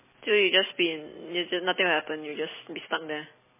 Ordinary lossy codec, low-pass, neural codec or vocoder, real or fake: MP3, 16 kbps; 3.6 kHz; none; real